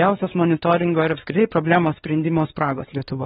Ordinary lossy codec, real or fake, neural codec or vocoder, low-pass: AAC, 16 kbps; fake; codec, 16 kHz, 2 kbps, X-Codec, WavLM features, trained on Multilingual LibriSpeech; 7.2 kHz